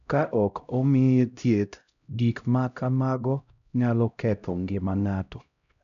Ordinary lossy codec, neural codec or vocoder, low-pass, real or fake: none; codec, 16 kHz, 0.5 kbps, X-Codec, HuBERT features, trained on LibriSpeech; 7.2 kHz; fake